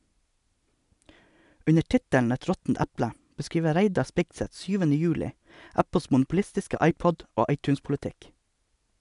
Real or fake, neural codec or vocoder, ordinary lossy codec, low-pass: real; none; none; 10.8 kHz